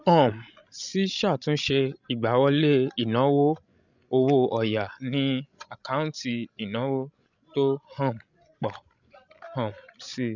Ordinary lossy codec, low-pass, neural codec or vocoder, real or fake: none; 7.2 kHz; codec, 16 kHz, 16 kbps, FreqCodec, larger model; fake